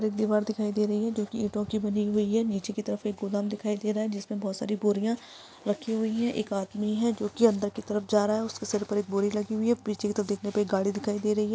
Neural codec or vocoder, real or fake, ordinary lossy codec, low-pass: none; real; none; none